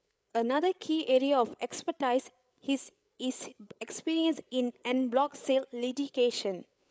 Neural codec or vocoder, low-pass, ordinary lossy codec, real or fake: codec, 16 kHz, 8 kbps, FreqCodec, larger model; none; none; fake